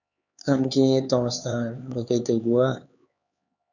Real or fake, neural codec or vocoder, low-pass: fake; codec, 16 kHz, 4 kbps, X-Codec, HuBERT features, trained on LibriSpeech; 7.2 kHz